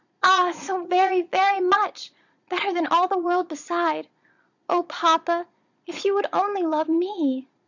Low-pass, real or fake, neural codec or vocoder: 7.2 kHz; fake; vocoder, 22.05 kHz, 80 mel bands, Vocos